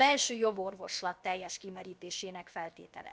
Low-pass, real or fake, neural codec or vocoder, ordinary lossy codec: none; fake; codec, 16 kHz, about 1 kbps, DyCAST, with the encoder's durations; none